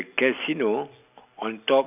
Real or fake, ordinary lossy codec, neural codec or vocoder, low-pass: real; none; none; 3.6 kHz